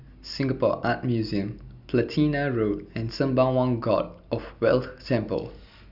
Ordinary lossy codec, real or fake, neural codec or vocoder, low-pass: none; real; none; 5.4 kHz